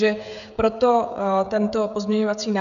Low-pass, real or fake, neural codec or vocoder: 7.2 kHz; fake; codec, 16 kHz, 16 kbps, FreqCodec, smaller model